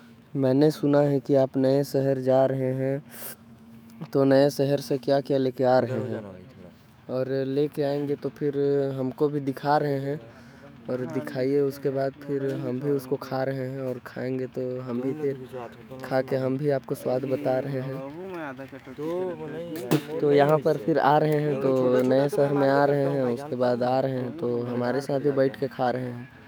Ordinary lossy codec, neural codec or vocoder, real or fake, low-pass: none; autoencoder, 48 kHz, 128 numbers a frame, DAC-VAE, trained on Japanese speech; fake; none